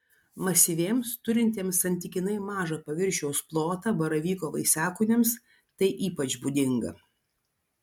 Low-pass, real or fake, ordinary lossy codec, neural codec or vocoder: 19.8 kHz; real; MP3, 96 kbps; none